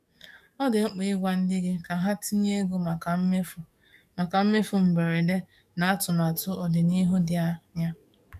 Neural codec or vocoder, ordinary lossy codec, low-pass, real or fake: codec, 44.1 kHz, 7.8 kbps, DAC; none; 14.4 kHz; fake